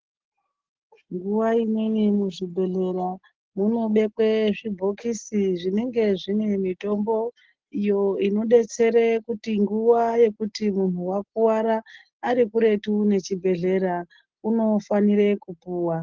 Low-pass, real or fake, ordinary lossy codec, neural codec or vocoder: 7.2 kHz; real; Opus, 16 kbps; none